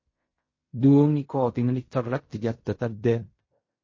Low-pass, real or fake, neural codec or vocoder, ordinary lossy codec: 7.2 kHz; fake; codec, 16 kHz in and 24 kHz out, 0.4 kbps, LongCat-Audio-Codec, fine tuned four codebook decoder; MP3, 32 kbps